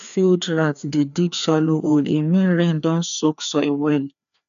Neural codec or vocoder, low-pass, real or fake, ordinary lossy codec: codec, 16 kHz, 2 kbps, FreqCodec, larger model; 7.2 kHz; fake; none